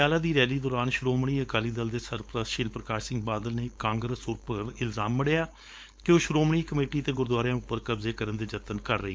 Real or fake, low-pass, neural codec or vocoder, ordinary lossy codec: fake; none; codec, 16 kHz, 4.8 kbps, FACodec; none